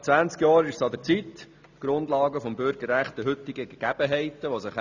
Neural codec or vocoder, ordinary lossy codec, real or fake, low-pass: none; none; real; 7.2 kHz